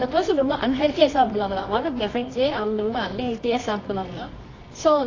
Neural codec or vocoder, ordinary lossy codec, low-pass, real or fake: codec, 24 kHz, 0.9 kbps, WavTokenizer, medium music audio release; AAC, 32 kbps; 7.2 kHz; fake